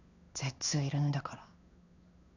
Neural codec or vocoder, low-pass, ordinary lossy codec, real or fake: codec, 16 kHz in and 24 kHz out, 1 kbps, XY-Tokenizer; 7.2 kHz; none; fake